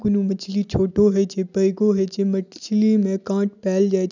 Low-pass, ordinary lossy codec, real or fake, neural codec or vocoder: 7.2 kHz; none; real; none